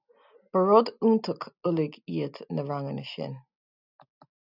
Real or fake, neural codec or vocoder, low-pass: real; none; 5.4 kHz